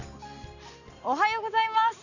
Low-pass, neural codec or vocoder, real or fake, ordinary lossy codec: 7.2 kHz; none; real; none